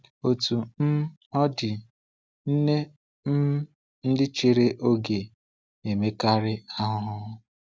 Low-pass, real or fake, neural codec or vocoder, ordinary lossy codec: none; real; none; none